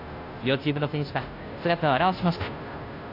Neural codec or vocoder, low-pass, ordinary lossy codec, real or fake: codec, 16 kHz, 0.5 kbps, FunCodec, trained on Chinese and English, 25 frames a second; 5.4 kHz; AAC, 32 kbps; fake